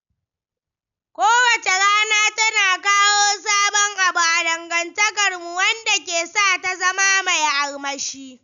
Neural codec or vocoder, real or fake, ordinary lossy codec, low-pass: none; real; none; 7.2 kHz